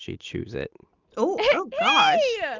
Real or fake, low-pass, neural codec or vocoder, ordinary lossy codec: real; 7.2 kHz; none; Opus, 24 kbps